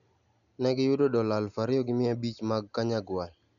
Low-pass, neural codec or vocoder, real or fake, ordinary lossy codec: 7.2 kHz; none; real; none